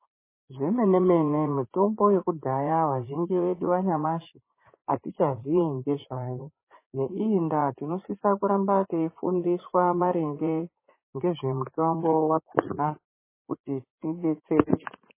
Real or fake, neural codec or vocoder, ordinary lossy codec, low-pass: fake; vocoder, 44.1 kHz, 80 mel bands, Vocos; MP3, 16 kbps; 3.6 kHz